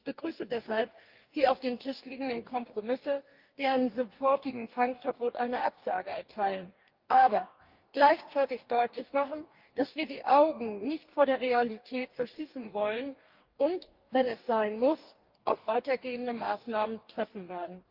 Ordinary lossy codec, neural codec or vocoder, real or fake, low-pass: Opus, 32 kbps; codec, 44.1 kHz, 2.6 kbps, DAC; fake; 5.4 kHz